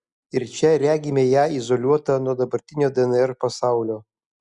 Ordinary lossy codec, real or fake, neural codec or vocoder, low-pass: Opus, 64 kbps; real; none; 10.8 kHz